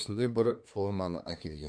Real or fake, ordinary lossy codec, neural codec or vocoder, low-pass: fake; MP3, 64 kbps; codec, 24 kHz, 1 kbps, SNAC; 9.9 kHz